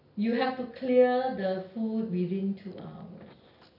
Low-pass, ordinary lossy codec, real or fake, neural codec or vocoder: 5.4 kHz; none; real; none